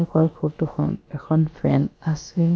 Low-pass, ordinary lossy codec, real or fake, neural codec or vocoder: none; none; fake; codec, 16 kHz, about 1 kbps, DyCAST, with the encoder's durations